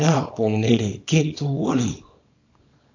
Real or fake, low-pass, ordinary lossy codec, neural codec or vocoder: fake; 7.2 kHz; AAC, 48 kbps; codec, 24 kHz, 0.9 kbps, WavTokenizer, small release